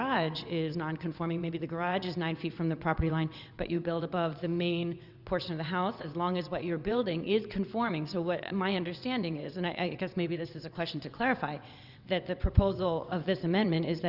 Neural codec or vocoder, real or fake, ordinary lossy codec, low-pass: vocoder, 22.05 kHz, 80 mel bands, WaveNeXt; fake; Opus, 64 kbps; 5.4 kHz